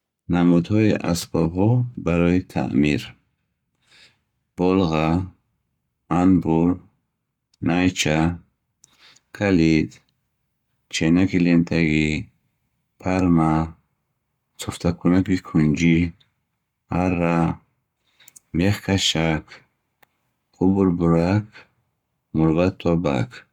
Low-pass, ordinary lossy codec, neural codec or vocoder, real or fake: 19.8 kHz; none; codec, 44.1 kHz, 7.8 kbps, Pupu-Codec; fake